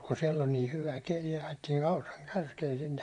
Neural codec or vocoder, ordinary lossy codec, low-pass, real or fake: vocoder, 44.1 kHz, 128 mel bands, Pupu-Vocoder; none; 10.8 kHz; fake